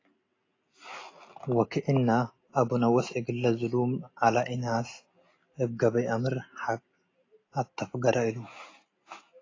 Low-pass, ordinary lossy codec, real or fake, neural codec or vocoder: 7.2 kHz; AAC, 32 kbps; real; none